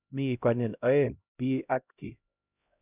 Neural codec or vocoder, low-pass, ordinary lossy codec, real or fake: codec, 16 kHz, 0.5 kbps, X-Codec, HuBERT features, trained on LibriSpeech; 3.6 kHz; none; fake